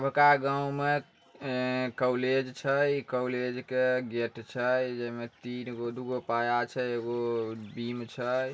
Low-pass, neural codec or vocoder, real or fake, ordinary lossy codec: none; none; real; none